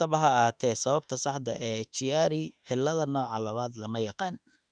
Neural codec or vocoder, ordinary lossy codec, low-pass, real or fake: autoencoder, 48 kHz, 32 numbers a frame, DAC-VAE, trained on Japanese speech; none; 9.9 kHz; fake